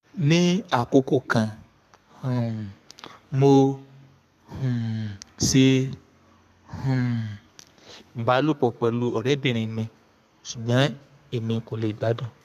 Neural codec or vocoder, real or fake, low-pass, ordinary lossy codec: codec, 32 kHz, 1.9 kbps, SNAC; fake; 14.4 kHz; none